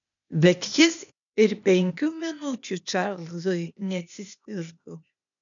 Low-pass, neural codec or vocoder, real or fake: 7.2 kHz; codec, 16 kHz, 0.8 kbps, ZipCodec; fake